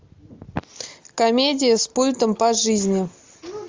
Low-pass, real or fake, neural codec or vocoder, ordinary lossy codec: 7.2 kHz; real; none; Opus, 32 kbps